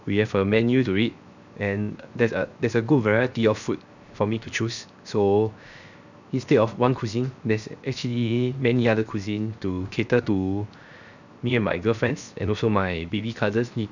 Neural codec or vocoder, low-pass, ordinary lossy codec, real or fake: codec, 16 kHz, 0.7 kbps, FocalCodec; 7.2 kHz; none; fake